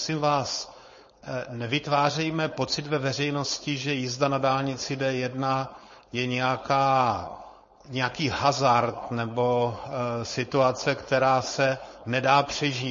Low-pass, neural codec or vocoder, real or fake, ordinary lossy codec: 7.2 kHz; codec, 16 kHz, 4.8 kbps, FACodec; fake; MP3, 32 kbps